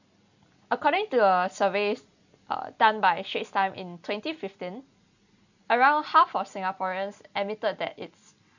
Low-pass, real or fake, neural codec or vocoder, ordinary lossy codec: 7.2 kHz; real; none; none